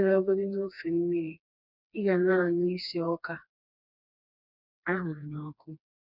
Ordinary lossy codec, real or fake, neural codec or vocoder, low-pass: none; fake; codec, 16 kHz, 2 kbps, FreqCodec, smaller model; 5.4 kHz